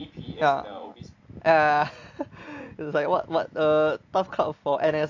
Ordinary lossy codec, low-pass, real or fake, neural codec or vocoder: AAC, 48 kbps; 7.2 kHz; real; none